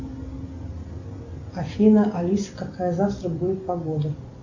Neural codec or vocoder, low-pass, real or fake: none; 7.2 kHz; real